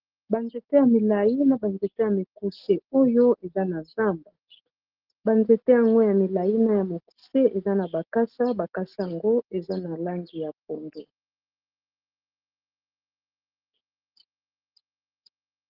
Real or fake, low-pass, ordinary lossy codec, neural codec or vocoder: real; 5.4 kHz; Opus, 16 kbps; none